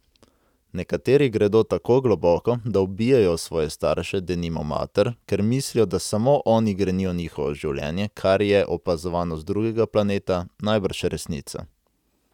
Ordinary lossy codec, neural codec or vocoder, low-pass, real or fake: none; none; 19.8 kHz; real